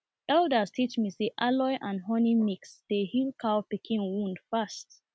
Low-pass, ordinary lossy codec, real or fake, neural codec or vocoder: none; none; real; none